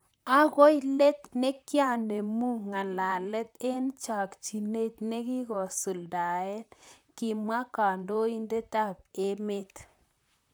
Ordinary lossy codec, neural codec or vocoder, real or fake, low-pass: none; vocoder, 44.1 kHz, 128 mel bands, Pupu-Vocoder; fake; none